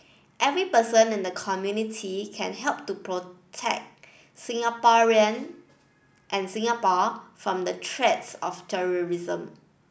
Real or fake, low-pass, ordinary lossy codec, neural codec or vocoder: real; none; none; none